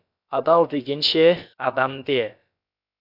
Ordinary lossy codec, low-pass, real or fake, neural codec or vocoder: MP3, 48 kbps; 5.4 kHz; fake; codec, 16 kHz, about 1 kbps, DyCAST, with the encoder's durations